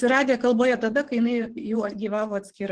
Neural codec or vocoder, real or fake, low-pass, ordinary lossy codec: vocoder, 24 kHz, 100 mel bands, Vocos; fake; 9.9 kHz; Opus, 16 kbps